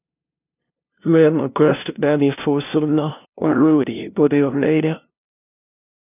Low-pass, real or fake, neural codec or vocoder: 3.6 kHz; fake; codec, 16 kHz, 0.5 kbps, FunCodec, trained on LibriTTS, 25 frames a second